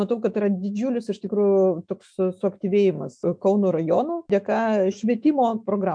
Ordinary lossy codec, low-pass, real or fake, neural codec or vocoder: MP3, 64 kbps; 9.9 kHz; fake; autoencoder, 48 kHz, 128 numbers a frame, DAC-VAE, trained on Japanese speech